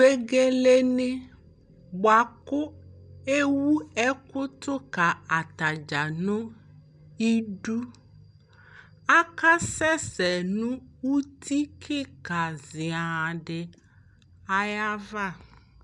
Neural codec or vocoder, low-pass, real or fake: none; 10.8 kHz; real